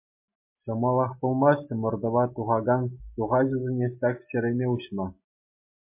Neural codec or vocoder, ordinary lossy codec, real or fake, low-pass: none; MP3, 24 kbps; real; 3.6 kHz